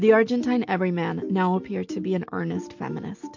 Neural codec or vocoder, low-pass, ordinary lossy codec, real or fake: none; 7.2 kHz; MP3, 48 kbps; real